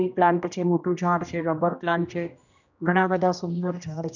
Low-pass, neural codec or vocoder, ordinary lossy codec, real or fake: 7.2 kHz; codec, 16 kHz, 1 kbps, X-Codec, HuBERT features, trained on general audio; none; fake